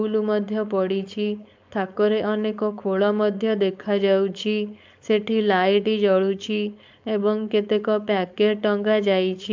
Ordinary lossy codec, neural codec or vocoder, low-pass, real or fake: MP3, 64 kbps; codec, 16 kHz, 4.8 kbps, FACodec; 7.2 kHz; fake